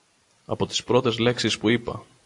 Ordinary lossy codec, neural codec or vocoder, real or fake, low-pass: AAC, 48 kbps; none; real; 10.8 kHz